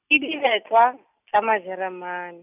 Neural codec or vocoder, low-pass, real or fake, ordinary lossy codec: none; 3.6 kHz; real; none